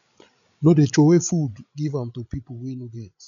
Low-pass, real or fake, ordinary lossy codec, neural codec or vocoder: 7.2 kHz; fake; none; codec, 16 kHz, 8 kbps, FreqCodec, larger model